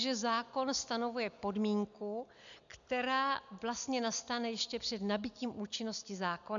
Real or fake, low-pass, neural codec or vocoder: real; 7.2 kHz; none